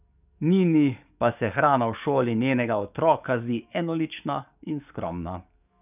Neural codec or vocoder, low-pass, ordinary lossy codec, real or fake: codec, 44.1 kHz, 7.8 kbps, DAC; 3.6 kHz; none; fake